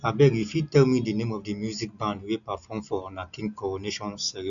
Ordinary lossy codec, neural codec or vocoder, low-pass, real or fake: none; none; 7.2 kHz; real